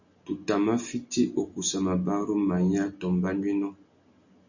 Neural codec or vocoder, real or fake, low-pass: none; real; 7.2 kHz